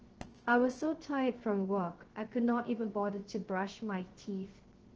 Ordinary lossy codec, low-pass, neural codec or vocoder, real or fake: Opus, 16 kbps; 7.2 kHz; codec, 16 kHz, 0.3 kbps, FocalCodec; fake